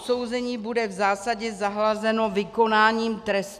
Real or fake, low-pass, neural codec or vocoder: real; 14.4 kHz; none